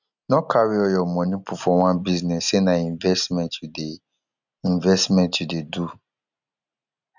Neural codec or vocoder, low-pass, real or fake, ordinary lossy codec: none; 7.2 kHz; real; none